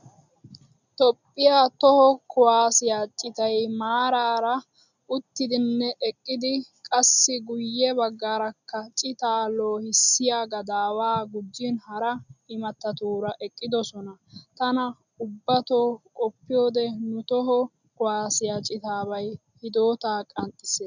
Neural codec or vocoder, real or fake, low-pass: none; real; 7.2 kHz